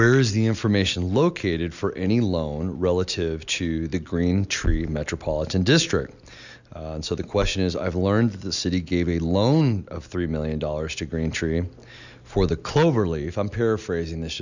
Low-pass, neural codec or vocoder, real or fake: 7.2 kHz; none; real